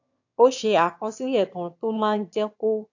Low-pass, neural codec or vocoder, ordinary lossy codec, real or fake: 7.2 kHz; autoencoder, 22.05 kHz, a latent of 192 numbers a frame, VITS, trained on one speaker; AAC, 48 kbps; fake